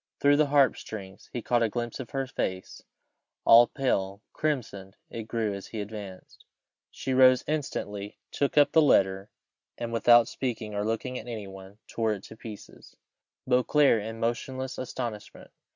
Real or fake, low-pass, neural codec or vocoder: real; 7.2 kHz; none